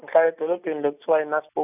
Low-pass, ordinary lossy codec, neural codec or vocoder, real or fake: 3.6 kHz; none; none; real